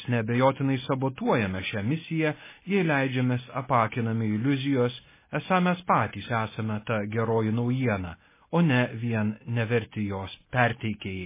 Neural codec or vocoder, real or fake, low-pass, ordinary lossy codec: none; real; 3.6 kHz; MP3, 16 kbps